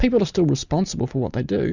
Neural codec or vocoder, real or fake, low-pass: none; real; 7.2 kHz